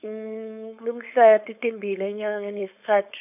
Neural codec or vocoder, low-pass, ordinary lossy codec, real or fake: codec, 16 kHz, 4.8 kbps, FACodec; 3.6 kHz; none; fake